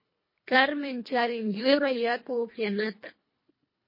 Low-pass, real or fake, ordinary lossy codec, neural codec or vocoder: 5.4 kHz; fake; MP3, 24 kbps; codec, 24 kHz, 1.5 kbps, HILCodec